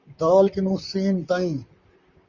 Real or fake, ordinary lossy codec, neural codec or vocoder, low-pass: fake; Opus, 64 kbps; vocoder, 44.1 kHz, 128 mel bands, Pupu-Vocoder; 7.2 kHz